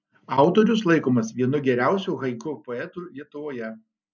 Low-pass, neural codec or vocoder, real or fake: 7.2 kHz; none; real